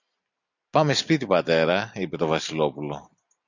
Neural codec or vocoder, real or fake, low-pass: none; real; 7.2 kHz